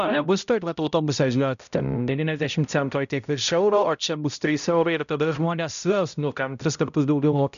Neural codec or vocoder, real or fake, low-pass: codec, 16 kHz, 0.5 kbps, X-Codec, HuBERT features, trained on balanced general audio; fake; 7.2 kHz